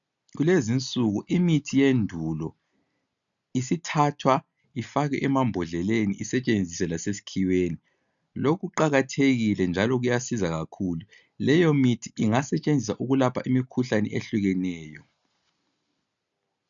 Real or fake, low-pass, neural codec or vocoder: real; 7.2 kHz; none